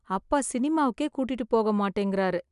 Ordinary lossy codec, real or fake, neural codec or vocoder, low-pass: none; real; none; 10.8 kHz